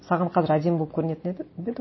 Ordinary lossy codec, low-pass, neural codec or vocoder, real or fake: MP3, 24 kbps; 7.2 kHz; none; real